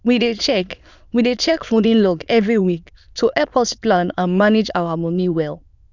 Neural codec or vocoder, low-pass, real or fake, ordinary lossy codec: autoencoder, 22.05 kHz, a latent of 192 numbers a frame, VITS, trained on many speakers; 7.2 kHz; fake; none